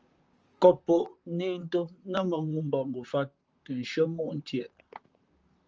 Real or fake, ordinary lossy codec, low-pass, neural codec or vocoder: fake; Opus, 24 kbps; 7.2 kHz; vocoder, 44.1 kHz, 128 mel bands, Pupu-Vocoder